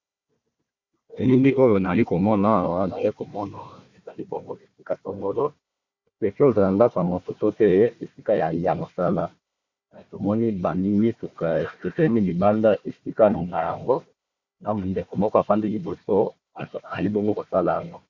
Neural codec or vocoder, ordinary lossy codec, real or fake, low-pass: codec, 16 kHz, 1 kbps, FunCodec, trained on Chinese and English, 50 frames a second; Opus, 64 kbps; fake; 7.2 kHz